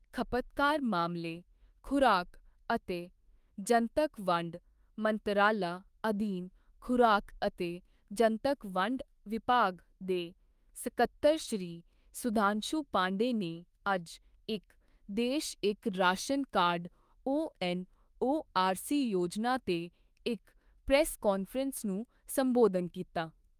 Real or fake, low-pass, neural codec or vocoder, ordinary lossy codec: fake; 14.4 kHz; autoencoder, 48 kHz, 32 numbers a frame, DAC-VAE, trained on Japanese speech; Opus, 32 kbps